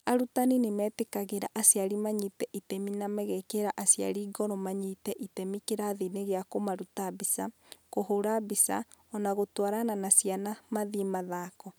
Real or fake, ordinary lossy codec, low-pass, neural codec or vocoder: real; none; none; none